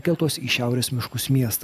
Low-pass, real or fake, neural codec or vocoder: 14.4 kHz; real; none